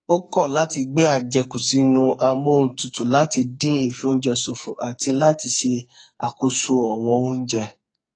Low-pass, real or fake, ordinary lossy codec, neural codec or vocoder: 9.9 kHz; fake; AAC, 32 kbps; codec, 44.1 kHz, 2.6 kbps, SNAC